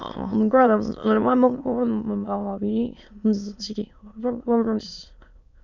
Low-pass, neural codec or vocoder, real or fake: 7.2 kHz; autoencoder, 22.05 kHz, a latent of 192 numbers a frame, VITS, trained on many speakers; fake